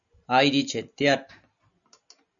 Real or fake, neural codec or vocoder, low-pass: real; none; 7.2 kHz